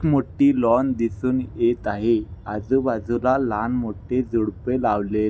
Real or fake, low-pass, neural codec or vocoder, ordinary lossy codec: real; none; none; none